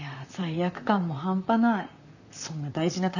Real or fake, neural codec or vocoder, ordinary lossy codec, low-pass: fake; vocoder, 44.1 kHz, 128 mel bands, Pupu-Vocoder; none; 7.2 kHz